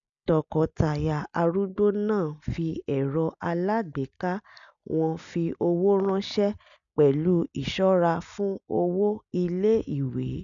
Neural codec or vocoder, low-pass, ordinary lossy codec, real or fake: none; 7.2 kHz; none; real